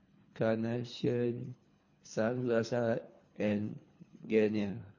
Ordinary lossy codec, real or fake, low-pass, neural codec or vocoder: MP3, 32 kbps; fake; 7.2 kHz; codec, 24 kHz, 3 kbps, HILCodec